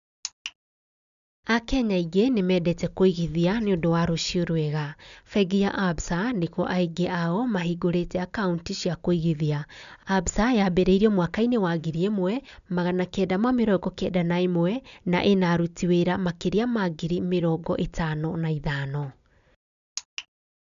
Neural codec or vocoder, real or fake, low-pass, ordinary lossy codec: none; real; 7.2 kHz; none